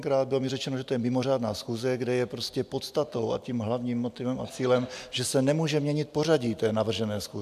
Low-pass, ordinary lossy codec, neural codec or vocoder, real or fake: 14.4 kHz; AAC, 96 kbps; none; real